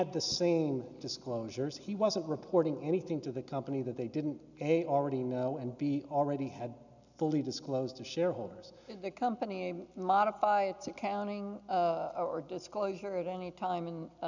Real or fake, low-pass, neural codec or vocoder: real; 7.2 kHz; none